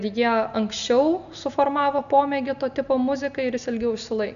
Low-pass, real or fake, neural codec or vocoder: 7.2 kHz; real; none